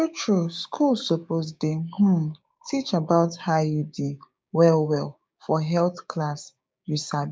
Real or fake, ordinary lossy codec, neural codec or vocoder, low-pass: fake; none; codec, 16 kHz, 6 kbps, DAC; none